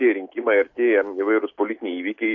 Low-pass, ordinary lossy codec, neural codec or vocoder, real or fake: 7.2 kHz; AAC, 48 kbps; none; real